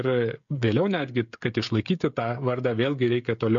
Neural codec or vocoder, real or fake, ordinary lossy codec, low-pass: codec, 16 kHz, 16 kbps, FreqCodec, smaller model; fake; MP3, 48 kbps; 7.2 kHz